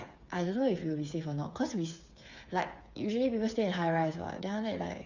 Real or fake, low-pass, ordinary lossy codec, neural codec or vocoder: fake; 7.2 kHz; Opus, 64 kbps; codec, 16 kHz, 8 kbps, FreqCodec, smaller model